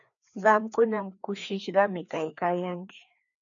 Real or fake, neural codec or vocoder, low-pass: fake; codec, 16 kHz, 2 kbps, FreqCodec, larger model; 7.2 kHz